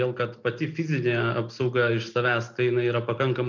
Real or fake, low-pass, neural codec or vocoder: real; 7.2 kHz; none